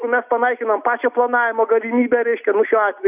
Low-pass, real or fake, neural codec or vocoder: 3.6 kHz; real; none